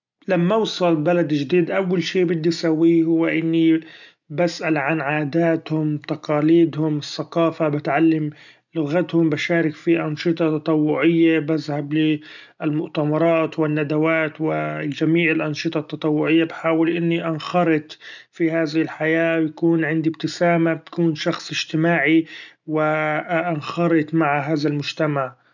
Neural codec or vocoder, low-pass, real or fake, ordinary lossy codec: none; 7.2 kHz; real; none